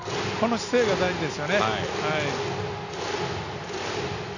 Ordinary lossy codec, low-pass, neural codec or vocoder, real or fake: none; 7.2 kHz; none; real